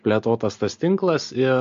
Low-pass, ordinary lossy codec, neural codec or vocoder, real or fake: 7.2 kHz; MP3, 48 kbps; none; real